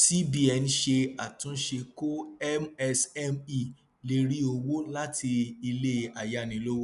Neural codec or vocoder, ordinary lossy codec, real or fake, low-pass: none; none; real; 10.8 kHz